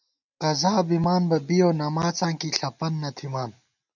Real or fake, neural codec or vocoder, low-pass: real; none; 7.2 kHz